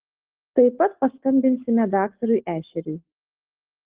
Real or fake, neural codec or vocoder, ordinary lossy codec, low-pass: real; none; Opus, 16 kbps; 3.6 kHz